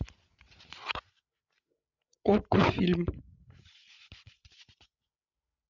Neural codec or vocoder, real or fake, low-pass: codec, 16 kHz, 16 kbps, FreqCodec, larger model; fake; 7.2 kHz